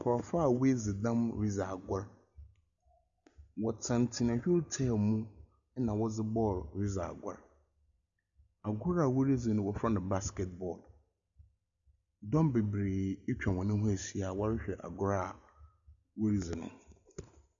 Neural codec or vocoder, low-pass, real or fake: none; 7.2 kHz; real